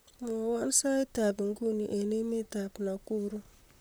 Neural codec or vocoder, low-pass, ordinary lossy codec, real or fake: vocoder, 44.1 kHz, 128 mel bands, Pupu-Vocoder; none; none; fake